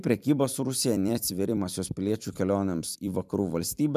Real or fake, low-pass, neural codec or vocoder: fake; 14.4 kHz; vocoder, 44.1 kHz, 128 mel bands every 256 samples, BigVGAN v2